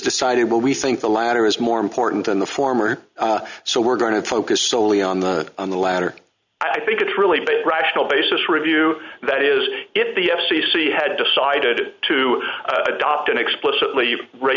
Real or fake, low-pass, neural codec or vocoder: real; 7.2 kHz; none